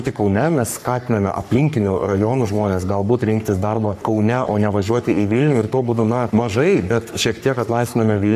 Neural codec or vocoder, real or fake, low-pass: codec, 44.1 kHz, 3.4 kbps, Pupu-Codec; fake; 14.4 kHz